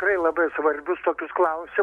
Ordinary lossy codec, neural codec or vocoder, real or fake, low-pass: MP3, 64 kbps; none; real; 19.8 kHz